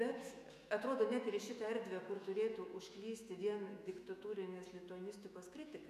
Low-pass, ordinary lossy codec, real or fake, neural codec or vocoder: 14.4 kHz; MP3, 96 kbps; fake; autoencoder, 48 kHz, 128 numbers a frame, DAC-VAE, trained on Japanese speech